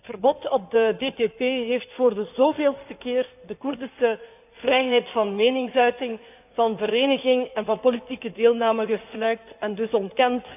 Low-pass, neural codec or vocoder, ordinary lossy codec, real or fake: 3.6 kHz; codec, 16 kHz in and 24 kHz out, 2.2 kbps, FireRedTTS-2 codec; none; fake